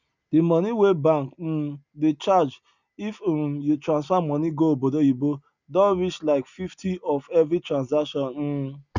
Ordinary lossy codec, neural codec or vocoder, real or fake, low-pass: none; none; real; 7.2 kHz